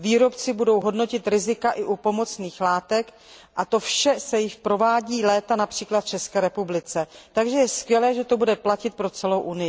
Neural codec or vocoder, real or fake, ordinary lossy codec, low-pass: none; real; none; none